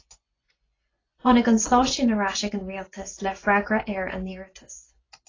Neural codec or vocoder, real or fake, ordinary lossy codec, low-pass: vocoder, 24 kHz, 100 mel bands, Vocos; fake; AAC, 32 kbps; 7.2 kHz